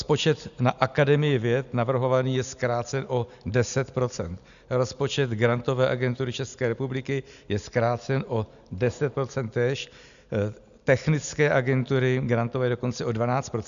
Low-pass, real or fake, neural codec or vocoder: 7.2 kHz; real; none